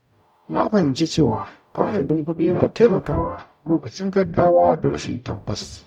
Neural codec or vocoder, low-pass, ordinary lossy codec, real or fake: codec, 44.1 kHz, 0.9 kbps, DAC; 19.8 kHz; none; fake